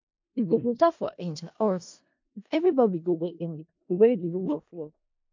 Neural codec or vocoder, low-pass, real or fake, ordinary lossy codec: codec, 16 kHz in and 24 kHz out, 0.4 kbps, LongCat-Audio-Codec, four codebook decoder; 7.2 kHz; fake; MP3, 48 kbps